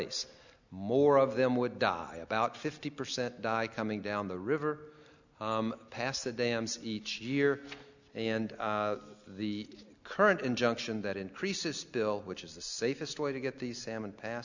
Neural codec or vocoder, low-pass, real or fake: none; 7.2 kHz; real